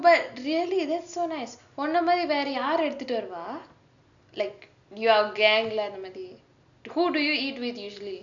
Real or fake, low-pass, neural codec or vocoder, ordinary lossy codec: real; 7.2 kHz; none; none